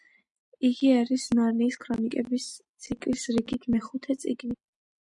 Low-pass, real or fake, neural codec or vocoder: 10.8 kHz; real; none